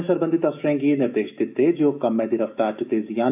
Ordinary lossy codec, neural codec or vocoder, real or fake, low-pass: AAC, 32 kbps; autoencoder, 48 kHz, 128 numbers a frame, DAC-VAE, trained on Japanese speech; fake; 3.6 kHz